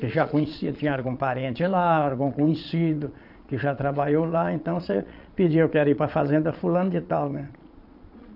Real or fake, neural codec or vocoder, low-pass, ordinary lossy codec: fake; vocoder, 22.05 kHz, 80 mel bands, Vocos; 5.4 kHz; none